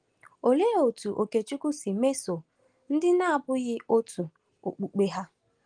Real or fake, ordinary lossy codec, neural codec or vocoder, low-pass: real; Opus, 24 kbps; none; 9.9 kHz